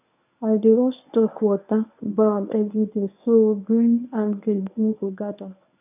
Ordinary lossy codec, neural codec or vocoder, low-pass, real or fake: none; codec, 24 kHz, 0.9 kbps, WavTokenizer, medium speech release version 1; 3.6 kHz; fake